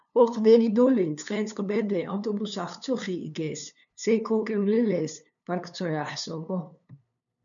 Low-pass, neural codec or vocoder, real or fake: 7.2 kHz; codec, 16 kHz, 2 kbps, FunCodec, trained on LibriTTS, 25 frames a second; fake